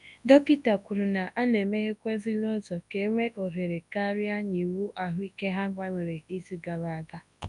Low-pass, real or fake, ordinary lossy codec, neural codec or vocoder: 10.8 kHz; fake; none; codec, 24 kHz, 0.9 kbps, WavTokenizer, large speech release